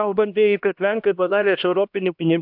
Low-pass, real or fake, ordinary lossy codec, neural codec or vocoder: 5.4 kHz; fake; AAC, 48 kbps; codec, 16 kHz, 1 kbps, X-Codec, HuBERT features, trained on LibriSpeech